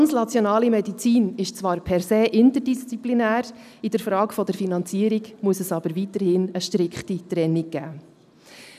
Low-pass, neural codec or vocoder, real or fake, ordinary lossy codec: 14.4 kHz; none; real; none